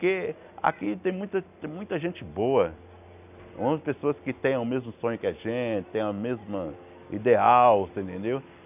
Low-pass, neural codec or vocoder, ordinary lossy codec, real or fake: 3.6 kHz; none; none; real